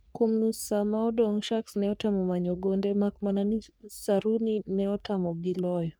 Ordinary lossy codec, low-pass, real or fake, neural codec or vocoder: none; none; fake; codec, 44.1 kHz, 3.4 kbps, Pupu-Codec